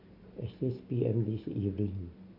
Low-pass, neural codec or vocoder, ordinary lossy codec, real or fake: 5.4 kHz; none; none; real